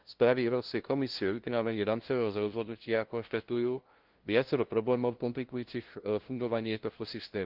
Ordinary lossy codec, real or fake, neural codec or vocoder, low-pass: Opus, 16 kbps; fake; codec, 16 kHz, 0.5 kbps, FunCodec, trained on LibriTTS, 25 frames a second; 5.4 kHz